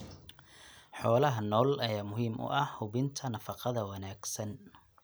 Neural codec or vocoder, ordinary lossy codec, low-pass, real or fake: none; none; none; real